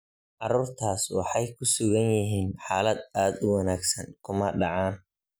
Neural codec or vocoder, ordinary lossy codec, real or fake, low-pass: none; none; real; none